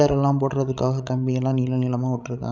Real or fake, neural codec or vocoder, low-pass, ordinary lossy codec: fake; codec, 16 kHz, 16 kbps, FreqCodec, larger model; 7.2 kHz; none